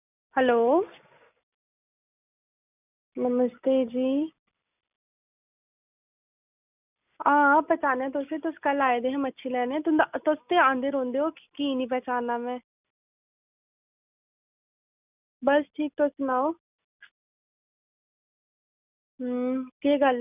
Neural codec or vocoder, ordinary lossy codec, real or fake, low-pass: none; none; real; 3.6 kHz